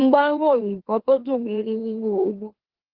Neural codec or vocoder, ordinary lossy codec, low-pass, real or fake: autoencoder, 44.1 kHz, a latent of 192 numbers a frame, MeloTTS; Opus, 16 kbps; 5.4 kHz; fake